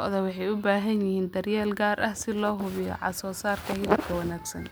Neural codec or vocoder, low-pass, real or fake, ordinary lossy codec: none; none; real; none